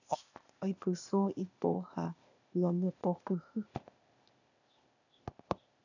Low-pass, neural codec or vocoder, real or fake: 7.2 kHz; codec, 16 kHz, 0.8 kbps, ZipCodec; fake